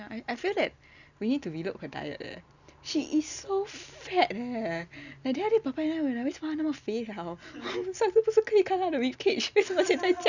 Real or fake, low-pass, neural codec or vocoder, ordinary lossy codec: real; 7.2 kHz; none; none